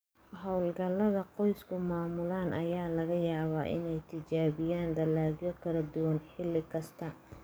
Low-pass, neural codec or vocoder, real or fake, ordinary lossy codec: none; codec, 44.1 kHz, 7.8 kbps, DAC; fake; none